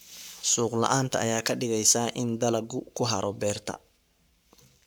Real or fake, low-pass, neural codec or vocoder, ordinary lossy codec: fake; none; codec, 44.1 kHz, 7.8 kbps, Pupu-Codec; none